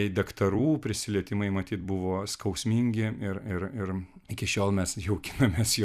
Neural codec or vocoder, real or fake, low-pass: vocoder, 44.1 kHz, 128 mel bands every 256 samples, BigVGAN v2; fake; 14.4 kHz